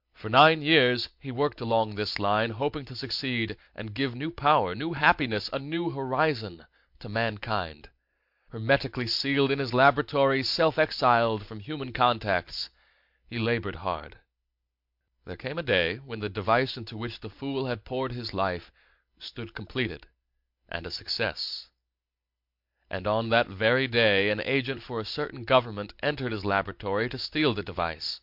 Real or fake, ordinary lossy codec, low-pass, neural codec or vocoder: real; MP3, 48 kbps; 5.4 kHz; none